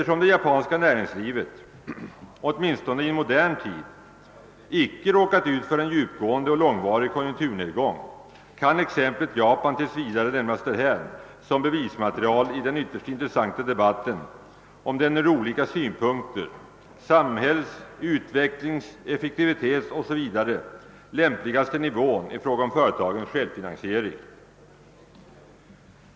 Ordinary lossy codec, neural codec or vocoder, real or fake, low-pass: none; none; real; none